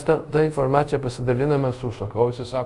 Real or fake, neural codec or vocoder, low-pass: fake; codec, 24 kHz, 0.5 kbps, DualCodec; 10.8 kHz